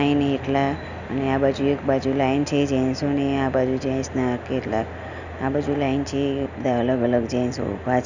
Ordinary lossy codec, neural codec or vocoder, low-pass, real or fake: none; none; 7.2 kHz; real